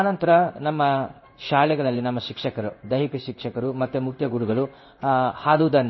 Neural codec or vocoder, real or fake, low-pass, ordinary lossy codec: codec, 16 kHz in and 24 kHz out, 1 kbps, XY-Tokenizer; fake; 7.2 kHz; MP3, 24 kbps